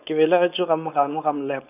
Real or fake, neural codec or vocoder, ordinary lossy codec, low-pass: fake; codec, 16 kHz, 4.8 kbps, FACodec; none; 3.6 kHz